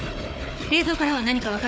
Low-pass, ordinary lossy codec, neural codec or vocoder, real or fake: none; none; codec, 16 kHz, 4 kbps, FunCodec, trained on Chinese and English, 50 frames a second; fake